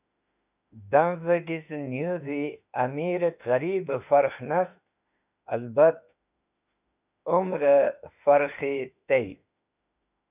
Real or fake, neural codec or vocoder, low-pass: fake; autoencoder, 48 kHz, 32 numbers a frame, DAC-VAE, trained on Japanese speech; 3.6 kHz